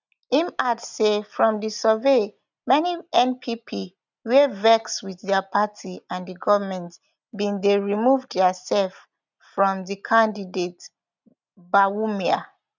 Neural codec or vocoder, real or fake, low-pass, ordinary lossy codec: none; real; 7.2 kHz; none